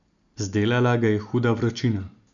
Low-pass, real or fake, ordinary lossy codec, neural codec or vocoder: 7.2 kHz; real; none; none